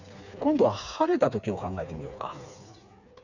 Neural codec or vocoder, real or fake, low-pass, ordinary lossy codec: codec, 16 kHz, 4 kbps, FreqCodec, smaller model; fake; 7.2 kHz; none